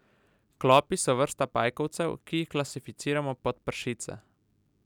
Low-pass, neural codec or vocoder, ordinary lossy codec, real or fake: 19.8 kHz; none; none; real